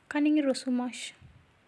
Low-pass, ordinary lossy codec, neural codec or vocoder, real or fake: none; none; none; real